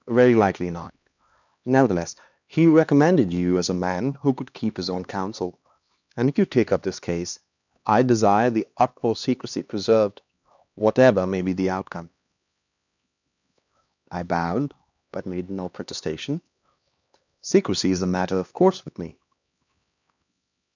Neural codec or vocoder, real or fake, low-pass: codec, 16 kHz, 2 kbps, X-Codec, HuBERT features, trained on LibriSpeech; fake; 7.2 kHz